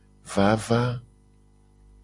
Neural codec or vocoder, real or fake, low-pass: none; real; 10.8 kHz